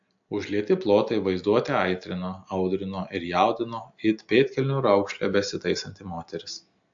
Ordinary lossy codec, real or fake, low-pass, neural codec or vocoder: AAC, 64 kbps; real; 7.2 kHz; none